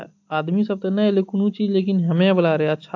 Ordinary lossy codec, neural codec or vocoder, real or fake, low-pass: AAC, 48 kbps; none; real; 7.2 kHz